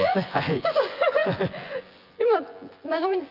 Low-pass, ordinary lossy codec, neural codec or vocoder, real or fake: 5.4 kHz; Opus, 32 kbps; vocoder, 24 kHz, 100 mel bands, Vocos; fake